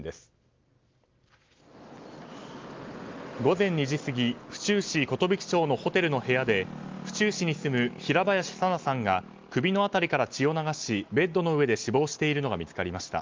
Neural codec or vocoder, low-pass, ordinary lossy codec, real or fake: none; 7.2 kHz; Opus, 24 kbps; real